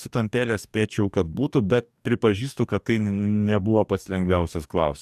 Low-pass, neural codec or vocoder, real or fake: 14.4 kHz; codec, 44.1 kHz, 2.6 kbps, DAC; fake